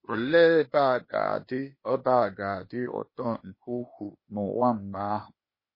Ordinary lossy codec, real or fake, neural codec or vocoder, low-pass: MP3, 24 kbps; fake; codec, 16 kHz, 0.8 kbps, ZipCodec; 5.4 kHz